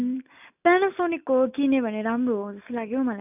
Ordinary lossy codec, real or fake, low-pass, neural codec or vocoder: none; real; 3.6 kHz; none